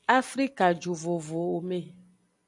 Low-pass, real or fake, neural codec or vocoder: 10.8 kHz; real; none